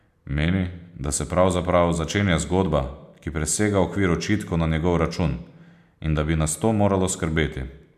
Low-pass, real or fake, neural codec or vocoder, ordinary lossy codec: 14.4 kHz; real; none; none